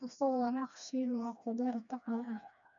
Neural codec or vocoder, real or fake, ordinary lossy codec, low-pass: codec, 16 kHz, 2 kbps, FreqCodec, smaller model; fake; none; 7.2 kHz